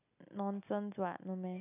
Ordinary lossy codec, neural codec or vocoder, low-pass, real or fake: none; none; 3.6 kHz; real